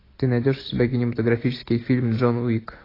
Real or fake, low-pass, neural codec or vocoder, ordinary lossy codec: real; 5.4 kHz; none; AAC, 24 kbps